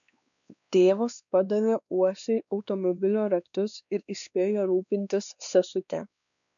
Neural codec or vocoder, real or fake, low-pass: codec, 16 kHz, 2 kbps, X-Codec, WavLM features, trained on Multilingual LibriSpeech; fake; 7.2 kHz